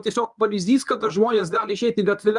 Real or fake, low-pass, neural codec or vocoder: fake; 10.8 kHz; codec, 24 kHz, 0.9 kbps, WavTokenizer, medium speech release version 1